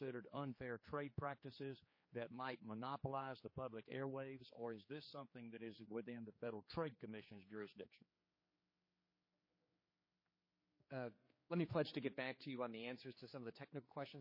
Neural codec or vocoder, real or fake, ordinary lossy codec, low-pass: codec, 16 kHz, 4 kbps, X-Codec, HuBERT features, trained on general audio; fake; MP3, 24 kbps; 5.4 kHz